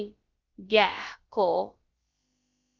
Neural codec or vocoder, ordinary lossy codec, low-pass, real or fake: codec, 16 kHz, about 1 kbps, DyCAST, with the encoder's durations; Opus, 32 kbps; 7.2 kHz; fake